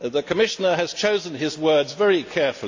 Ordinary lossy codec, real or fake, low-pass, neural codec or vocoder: AAC, 48 kbps; real; 7.2 kHz; none